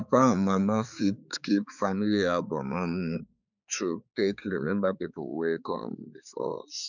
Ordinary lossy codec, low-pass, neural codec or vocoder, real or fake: none; 7.2 kHz; codec, 16 kHz, 4 kbps, X-Codec, HuBERT features, trained on balanced general audio; fake